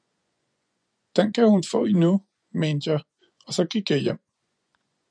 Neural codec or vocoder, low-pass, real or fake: vocoder, 24 kHz, 100 mel bands, Vocos; 9.9 kHz; fake